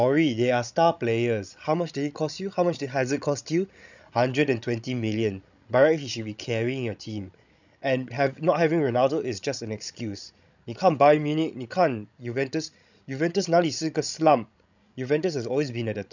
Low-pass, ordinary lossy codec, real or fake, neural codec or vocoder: 7.2 kHz; none; fake; codec, 16 kHz, 16 kbps, FreqCodec, larger model